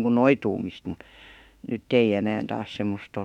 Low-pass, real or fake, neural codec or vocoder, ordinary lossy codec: 19.8 kHz; fake; autoencoder, 48 kHz, 32 numbers a frame, DAC-VAE, trained on Japanese speech; none